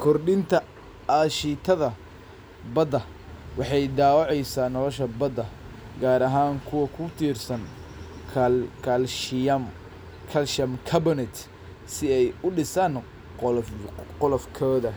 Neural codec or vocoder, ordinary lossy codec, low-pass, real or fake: none; none; none; real